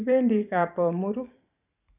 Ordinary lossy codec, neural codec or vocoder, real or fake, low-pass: none; vocoder, 44.1 kHz, 128 mel bands every 512 samples, BigVGAN v2; fake; 3.6 kHz